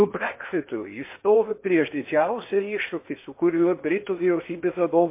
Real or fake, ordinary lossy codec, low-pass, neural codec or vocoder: fake; MP3, 24 kbps; 3.6 kHz; codec, 16 kHz in and 24 kHz out, 0.8 kbps, FocalCodec, streaming, 65536 codes